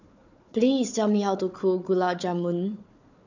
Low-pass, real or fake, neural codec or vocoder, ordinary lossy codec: 7.2 kHz; fake; codec, 16 kHz, 4 kbps, FunCodec, trained on Chinese and English, 50 frames a second; none